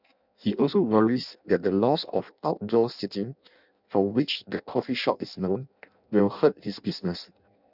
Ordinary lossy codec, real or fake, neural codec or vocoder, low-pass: none; fake; codec, 16 kHz in and 24 kHz out, 0.6 kbps, FireRedTTS-2 codec; 5.4 kHz